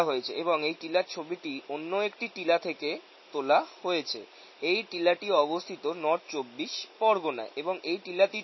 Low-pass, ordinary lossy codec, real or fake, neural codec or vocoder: 7.2 kHz; MP3, 24 kbps; real; none